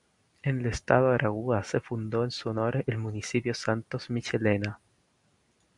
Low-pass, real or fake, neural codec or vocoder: 10.8 kHz; real; none